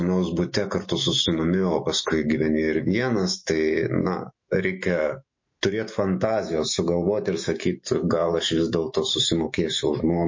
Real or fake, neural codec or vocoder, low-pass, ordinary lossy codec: fake; autoencoder, 48 kHz, 128 numbers a frame, DAC-VAE, trained on Japanese speech; 7.2 kHz; MP3, 32 kbps